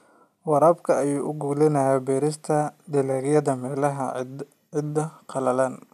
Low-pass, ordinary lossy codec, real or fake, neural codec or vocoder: 14.4 kHz; none; real; none